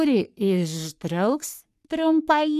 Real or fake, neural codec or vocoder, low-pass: fake; codec, 44.1 kHz, 3.4 kbps, Pupu-Codec; 14.4 kHz